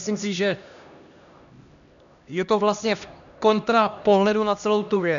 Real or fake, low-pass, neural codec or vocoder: fake; 7.2 kHz; codec, 16 kHz, 1 kbps, X-Codec, HuBERT features, trained on LibriSpeech